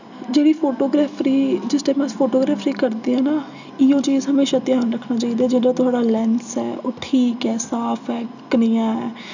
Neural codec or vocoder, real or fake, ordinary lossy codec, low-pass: none; real; none; 7.2 kHz